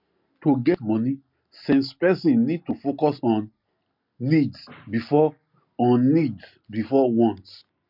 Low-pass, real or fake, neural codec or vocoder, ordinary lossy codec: 5.4 kHz; real; none; MP3, 48 kbps